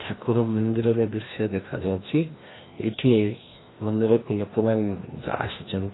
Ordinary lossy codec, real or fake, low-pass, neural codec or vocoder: AAC, 16 kbps; fake; 7.2 kHz; codec, 16 kHz, 1 kbps, FreqCodec, larger model